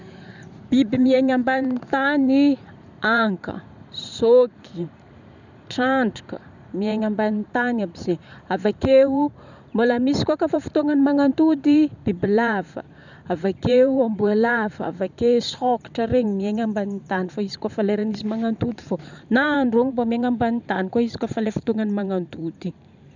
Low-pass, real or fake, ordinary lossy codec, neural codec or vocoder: 7.2 kHz; fake; none; vocoder, 44.1 kHz, 128 mel bands every 512 samples, BigVGAN v2